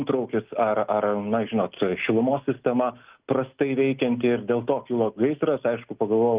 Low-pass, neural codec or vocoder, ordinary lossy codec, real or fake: 3.6 kHz; none; Opus, 32 kbps; real